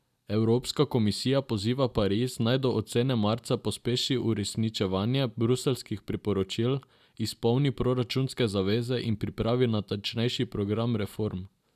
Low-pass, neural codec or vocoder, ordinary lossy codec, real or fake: 14.4 kHz; none; none; real